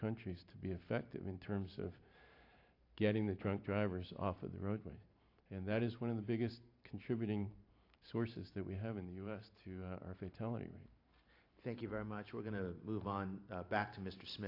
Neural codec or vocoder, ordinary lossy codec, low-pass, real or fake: vocoder, 44.1 kHz, 128 mel bands every 512 samples, BigVGAN v2; AAC, 32 kbps; 5.4 kHz; fake